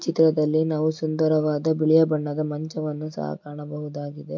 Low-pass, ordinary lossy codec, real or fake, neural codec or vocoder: 7.2 kHz; MP3, 48 kbps; real; none